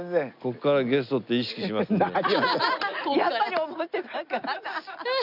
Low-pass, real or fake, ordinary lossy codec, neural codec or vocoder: 5.4 kHz; real; none; none